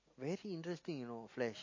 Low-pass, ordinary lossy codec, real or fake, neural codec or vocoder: 7.2 kHz; MP3, 32 kbps; real; none